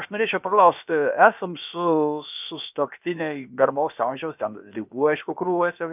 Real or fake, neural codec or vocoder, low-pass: fake; codec, 16 kHz, about 1 kbps, DyCAST, with the encoder's durations; 3.6 kHz